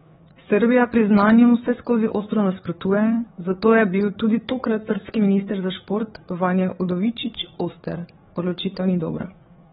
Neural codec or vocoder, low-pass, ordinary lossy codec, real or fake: codec, 16 kHz, 4 kbps, FreqCodec, larger model; 7.2 kHz; AAC, 16 kbps; fake